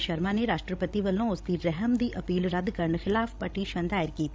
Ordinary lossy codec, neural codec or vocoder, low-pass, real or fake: none; codec, 16 kHz, 16 kbps, FreqCodec, larger model; none; fake